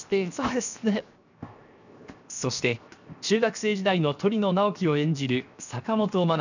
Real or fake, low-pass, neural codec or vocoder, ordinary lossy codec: fake; 7.2 kHz; codec, 16 kHz, 0.7 kbps, FocalCodec; none